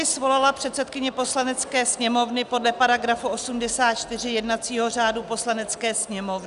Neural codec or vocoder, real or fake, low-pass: none; real; 10.8 kHz